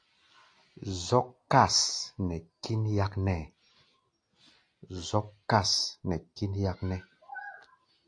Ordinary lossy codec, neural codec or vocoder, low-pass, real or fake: AAC, 48 kbps; none; 9.9 kHz; real